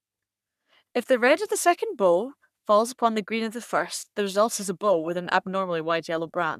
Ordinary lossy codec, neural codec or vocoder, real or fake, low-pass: none; codec, 44.1 kHz, 3.4 kbps, Pupu-Codec; fake; 14.4 kHz